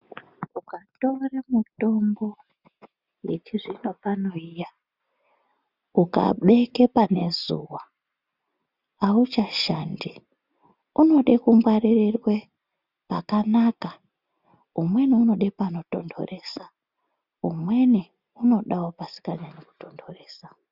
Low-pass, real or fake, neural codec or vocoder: 5.4 kHz; real; none